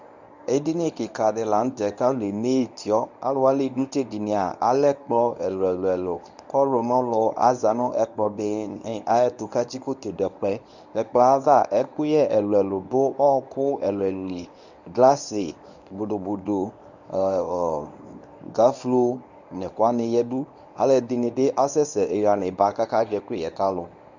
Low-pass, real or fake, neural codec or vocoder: 7.2 kHz; fake; codec, 24 kHz, 0.9 kbps, WavTokenizer, medium speech release version 1